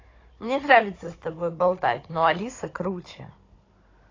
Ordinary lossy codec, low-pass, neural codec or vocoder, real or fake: AAC, 32 kbps; 7.2 kHz; codec, 16 kHz, 16 kbps, FunCodec, trained on Chinese and English, 50 frames a second; fake